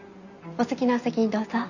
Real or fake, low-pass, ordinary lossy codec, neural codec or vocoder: real; 7.2 kHz; none; none